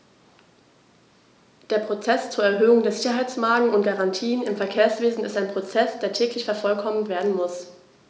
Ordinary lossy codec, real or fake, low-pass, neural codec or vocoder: none; real; none; none